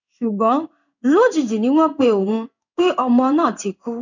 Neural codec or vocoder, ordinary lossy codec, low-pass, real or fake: codec, 16 kHz in and 24 kHz out, 1 kbps, XY-Tokenizer; none; 7.2 kHz; fake